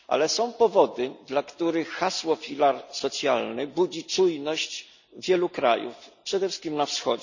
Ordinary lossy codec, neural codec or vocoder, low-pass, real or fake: none; none; 7.2 kHz; real